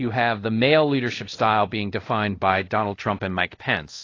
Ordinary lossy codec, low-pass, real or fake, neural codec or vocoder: AAC, 32 kbps; 7.2 kHz; fake; codec, 24 kHz, 0.5 kbps, DualCodec